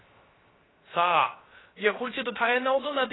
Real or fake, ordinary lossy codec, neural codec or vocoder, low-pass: fake; AAC, 16 kbps; codec, 16 kHz, 0.3 kbps, FocalCodec; 7.2 kHz